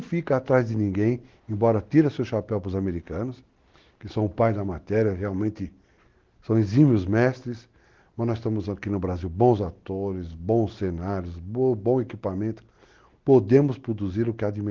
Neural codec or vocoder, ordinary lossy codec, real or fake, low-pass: none; Opus, 16 kbps; real; 7.2 kHz